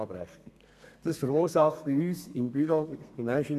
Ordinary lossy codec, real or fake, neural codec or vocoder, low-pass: MP3, 96 kbps; fake; codec, 32 kHz, 1.9 kbps, SNAC; 14.4 kHz